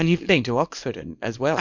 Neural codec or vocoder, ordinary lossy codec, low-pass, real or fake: codec, 24 kHz, 0.9 kbps, WavTokenizer, small release; MP3, 48 kbps; 7.2 kHz; fake